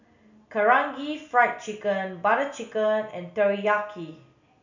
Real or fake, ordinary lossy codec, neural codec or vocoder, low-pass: real; none; none; 7.2 kHz